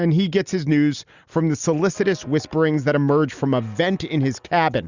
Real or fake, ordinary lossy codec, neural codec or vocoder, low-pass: real; Opus, 64 kbps; none; 7.2 kHz